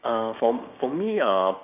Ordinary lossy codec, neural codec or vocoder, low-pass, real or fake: none; codec, 16 kHz, 6 kbps, DAC; 3.6 kHz; fake